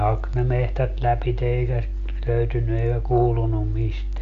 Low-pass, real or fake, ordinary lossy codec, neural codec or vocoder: 7.2 kHz; real; Opus, 64 kbps; none